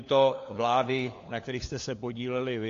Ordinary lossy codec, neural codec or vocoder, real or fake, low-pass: AAC, 48 kbps; codec, 16 kHz, 4 kbps, FunCodec, trained on LibriTTS, 50 frames a second; fake; 7.2 kHz